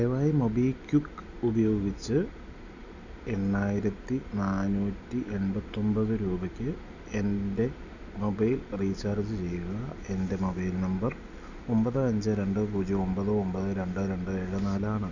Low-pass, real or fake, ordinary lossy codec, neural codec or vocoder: 7.2 kHz; real; none; none